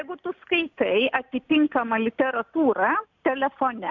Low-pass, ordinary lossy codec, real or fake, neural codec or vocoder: 7.2 kHz; MP3, 64 kbps; real; none